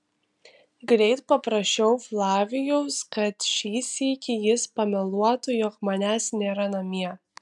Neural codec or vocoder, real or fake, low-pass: none; real; 10.8 kHz